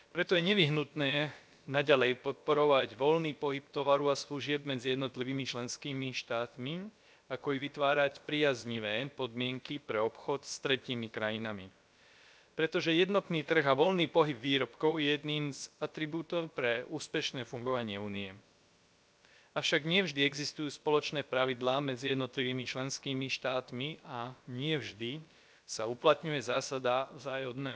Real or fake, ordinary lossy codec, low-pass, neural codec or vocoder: fake; none; none; codec, 16 kHz, about 1 kbps, DyCAST, with the encoder's durations